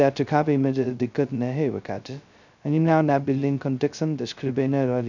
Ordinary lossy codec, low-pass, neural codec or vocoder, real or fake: none; 7.2 kHz; codec, 16 kHz, 0.2 kbps, FocalCodec; fake